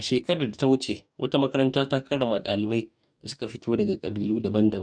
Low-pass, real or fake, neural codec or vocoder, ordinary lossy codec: 9.9 kHz; fake; codec, 44.1 kHz, 2.6 kbps, DAC; none